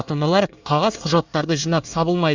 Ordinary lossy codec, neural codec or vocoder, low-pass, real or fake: Opus, 64 kbps; codec, 24 kHz, 1 kbps, SNAC; 7.2 kHz; fake